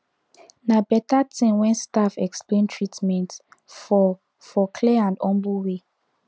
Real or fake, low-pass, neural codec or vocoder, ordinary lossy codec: real; none; none; none